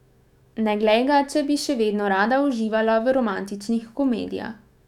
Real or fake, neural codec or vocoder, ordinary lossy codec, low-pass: fake; autoencoder, 48 kHz, 128 numbers a frame, DAC-VAE, trained on Japanese speech; none; 19.8 kHz